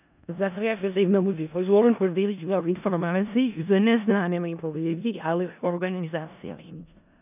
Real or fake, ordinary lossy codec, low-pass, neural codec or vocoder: fake; none; 3.6 kHz; codec, 16 kHz in and 24 kHz out, 0.4 kbps, LongCat-Audio-Codec, four codebook decoder